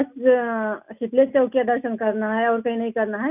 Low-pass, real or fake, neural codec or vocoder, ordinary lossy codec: 3.6 kHz; real; none; none